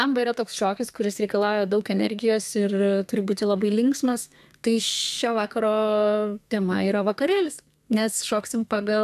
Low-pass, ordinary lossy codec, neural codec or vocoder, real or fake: 14.4 kHz; AAC, 96 kbps; codec, 32 kHz, 1.9 kbps, SNAC; fake